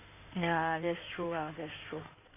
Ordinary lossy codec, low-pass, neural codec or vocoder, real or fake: none; 3.6 kHz; codec, 16 kHz in and 24 kHz out, 2.2 kbps, FireRedTTS-2 codec; fake